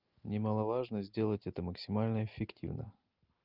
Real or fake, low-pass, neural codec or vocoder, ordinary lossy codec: real; 5.4 kHz; none; Opus, 32 kbps